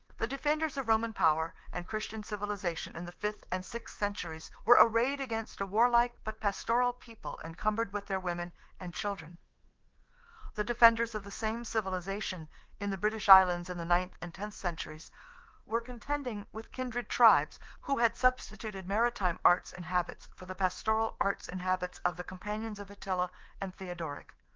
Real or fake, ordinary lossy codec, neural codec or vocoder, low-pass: fake; Opus, 16 kbps; codec, 24 kHz, 3.1 kbps, DualCodec; 7.2 kHz